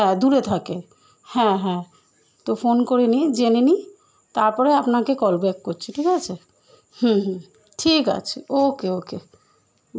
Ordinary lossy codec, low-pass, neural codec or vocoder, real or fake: none; none; none; real